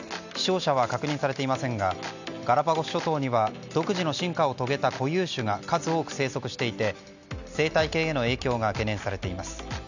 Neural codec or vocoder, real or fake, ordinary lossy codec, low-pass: none; real; none; 7.2 kHz